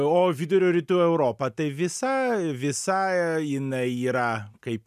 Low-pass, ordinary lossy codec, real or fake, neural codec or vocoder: 14.4 kHz; MP3, 96 kbps; real; none